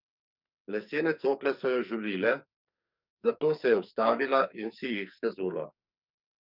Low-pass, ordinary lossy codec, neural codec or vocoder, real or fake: 5.4 kHz; none; codec, 44.1 kHz, 2.6 kbps, SNAC; fake